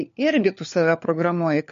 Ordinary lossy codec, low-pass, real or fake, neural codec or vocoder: MP3, 48 kbps; 7.2 kHz; fake; codec, 16 kHz, 4 kbps, FunCodec, trained on LibriTTS, 50 frames a second